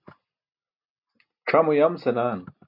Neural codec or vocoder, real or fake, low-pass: none; real; 5.4 kHz